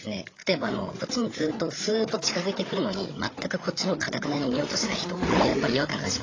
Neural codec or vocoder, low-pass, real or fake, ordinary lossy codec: codec, 16 kHz, 8 kbps, FreqCodec, larger model; 7.2 kHz; fake; AAC, 32 kbps